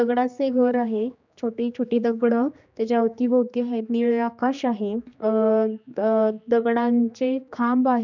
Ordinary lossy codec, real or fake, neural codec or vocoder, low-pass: none; fake; codec, 16 kHz, 2 kbps, X-Codec, HuBERT features, trained on general audio; 7.2 kHz